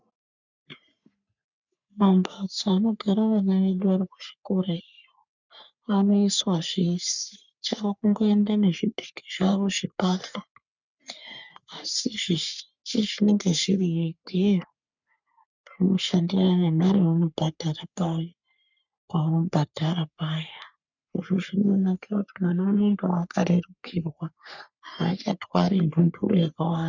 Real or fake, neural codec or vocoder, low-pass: fake; codec, 44.1 kHz, 3.4 kbps, Pupu-Codec; 7.2 kHz